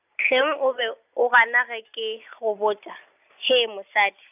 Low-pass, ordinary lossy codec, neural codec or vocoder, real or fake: 3.6 kHz; none; none; real